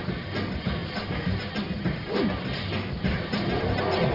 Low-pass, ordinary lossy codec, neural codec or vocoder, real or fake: 5.4 kHz; none; codec, 44.1 kHz, 1.7 kbps, Pupu-Codec; fake